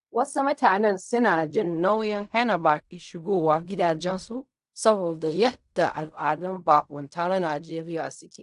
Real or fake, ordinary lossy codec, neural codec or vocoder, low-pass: fake; AAC, 96 kbps; codec, 16 kHz in and 24 kHz out, 0.4 kbps, LongCat-Audio-Codec, fine tuned four codebook decoder; 10.8 kHz